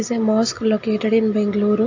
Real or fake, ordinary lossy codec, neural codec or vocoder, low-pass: real; AAC, 48 kbps; none; 7.2 kHz